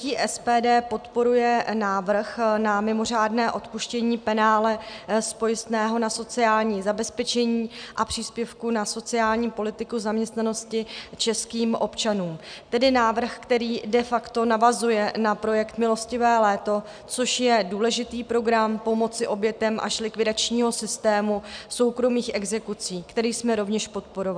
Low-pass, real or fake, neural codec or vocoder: 9.9 kHz; real; none